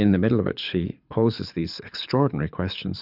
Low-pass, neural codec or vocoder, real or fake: 5.4 kHz; codec, 24 kHz, 6 kbps, HILCodec; fake